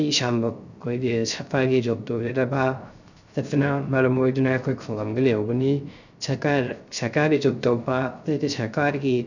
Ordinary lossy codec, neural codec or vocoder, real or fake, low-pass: none; codec, 16 kHz, 0.3 kbps, FocalCodec; fake; 7.2 kHz